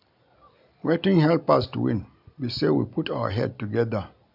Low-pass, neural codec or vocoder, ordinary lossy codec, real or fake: 5.4 kHz; none; none; real